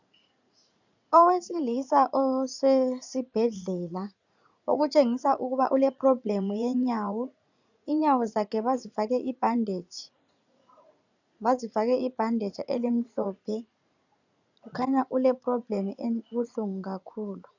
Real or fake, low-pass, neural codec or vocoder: fake; 7.2 kHz; vocoder, 44.1 kHz, 80 mel bands, Vocos